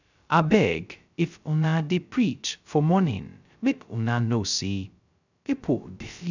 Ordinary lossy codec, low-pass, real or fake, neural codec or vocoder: none; 7.2 kHz; fake; codec, 16 kHz, 0.2 kbps, FocalCodec